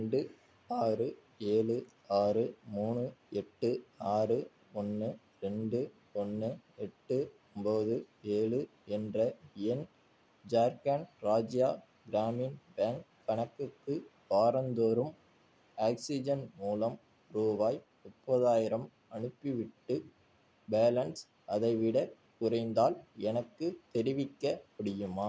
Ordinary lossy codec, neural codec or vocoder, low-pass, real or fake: none; none; none; real